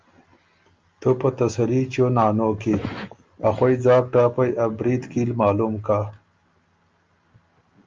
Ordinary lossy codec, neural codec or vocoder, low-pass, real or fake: Opus, 24 kbps; none; 7.2 kHz; real